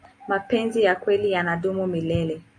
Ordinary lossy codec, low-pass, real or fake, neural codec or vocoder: MP3, 48 kbps; 9.9 kHz; real; none